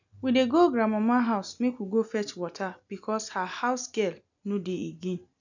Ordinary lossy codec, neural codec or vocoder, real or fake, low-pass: none; none; real; 7.2 kHz